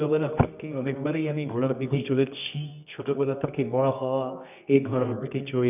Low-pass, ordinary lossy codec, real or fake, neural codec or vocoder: 3.6 kHz; none; fake; codec, 24 kHz, 0.9 kbps, WavTokenizer, medium music audio release